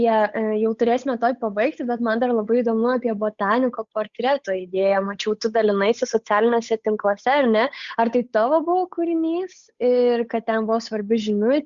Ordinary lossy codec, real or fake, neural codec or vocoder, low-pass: Opus, 64 kbps; fake; codec, 16 kHz, 8 kbps, FunCodec, trained on Chinese and English, 25 frames a second; 7.2 kHz